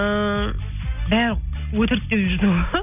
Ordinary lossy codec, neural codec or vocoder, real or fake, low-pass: none; none; real; 3.6 kHz